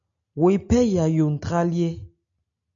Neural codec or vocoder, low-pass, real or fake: none; 7.2 kHz; real